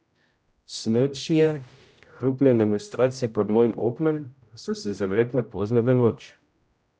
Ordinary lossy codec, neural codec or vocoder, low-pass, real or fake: none; codec, 16 kHz, 0.5 kbps, X-Codec, HuBERT features, trained on general audio; none; fake